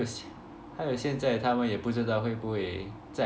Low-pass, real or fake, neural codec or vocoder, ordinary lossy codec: none; real; none; none